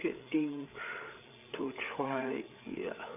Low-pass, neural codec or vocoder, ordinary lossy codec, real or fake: 3.6 kHz; codec, 16 kHz, 8 kbps, FreqCodec, larger model; none; fake